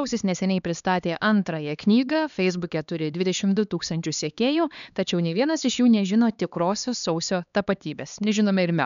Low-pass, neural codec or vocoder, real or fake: 7.2 kHz; codec, 16 kHz, 2 kbps, X-Codec, HuBERT features, trained on LibriSpeech; fake